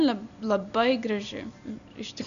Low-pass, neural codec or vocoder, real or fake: 7.2 kHz; none; real